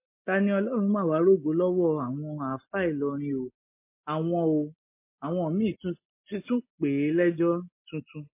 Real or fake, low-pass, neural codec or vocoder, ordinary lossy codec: real; 3.6 kHz; none; MP3, 24 kbps